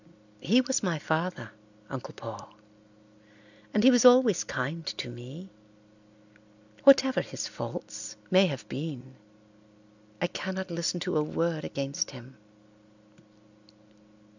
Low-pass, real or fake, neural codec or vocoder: 7.2 kHz; real; none